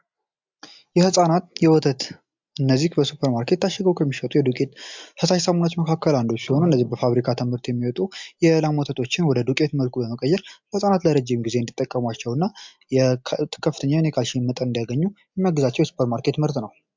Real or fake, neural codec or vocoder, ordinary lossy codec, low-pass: real; none; MP3, 64 kbps; 7.2 kHz